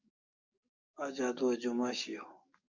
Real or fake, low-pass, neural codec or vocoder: fake; 7.2 kHz; codec, 44.1 kHz, 7.8 kbps, DAC